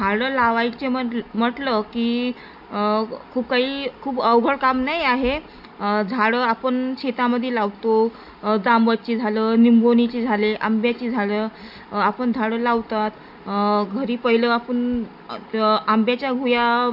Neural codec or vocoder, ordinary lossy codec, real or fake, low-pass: none; none; real; 5.4 kHz